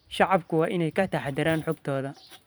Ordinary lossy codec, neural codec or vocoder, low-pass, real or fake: none; vocoder, 44.1 kHz, 128 mel bands every 512 samples, BigVGAN v2; none; fake